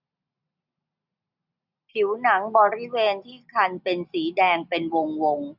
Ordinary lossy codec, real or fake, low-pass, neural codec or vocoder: none; real; 5.4 kHz; none